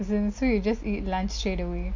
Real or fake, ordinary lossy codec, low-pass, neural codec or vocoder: real; MP3, 48 kbps; 7.2 kHz; none